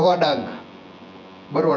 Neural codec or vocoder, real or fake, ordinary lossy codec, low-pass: vocoder, 24 kHz, 100 mel bands, Vocos; fake; none; 7.2 kHz